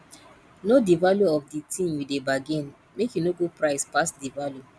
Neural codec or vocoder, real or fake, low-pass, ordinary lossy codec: none; real; none; none